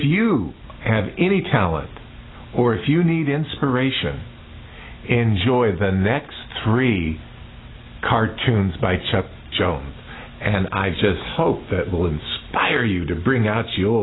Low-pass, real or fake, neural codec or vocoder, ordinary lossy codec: 7.2 kHz; real; none; AAC, 16 kbps